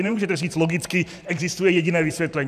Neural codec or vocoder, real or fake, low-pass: vocoder, 44.1 kHz, 128 mel bands every 512 samples, BigVGAN v2; fake; 14.4 kHz